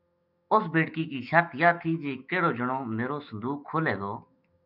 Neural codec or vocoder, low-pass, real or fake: autoencoder, 48 kHz, 128 numbers a frame, DAC-VAE, trained on Japanese speech; 5.4 kHz; fake